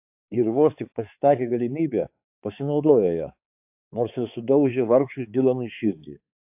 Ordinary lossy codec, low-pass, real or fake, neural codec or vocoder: AAC, 32 kbps; 3.6 kHz; fake; codec, 16 kHz, 4 kbps, X-Codec, HuBERT features, trained on balanced general audio